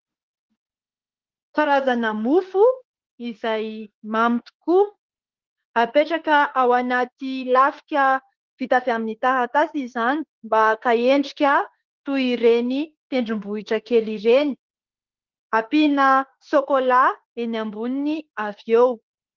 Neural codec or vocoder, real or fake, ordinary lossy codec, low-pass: autoencoder, 48 kHz, 32 numbers a frame, DAC-VAE, trained on Japanese speech; fake; Opus, 16 kbps; 7.2 kHz